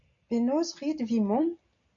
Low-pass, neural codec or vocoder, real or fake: 7.2 kHz; none; real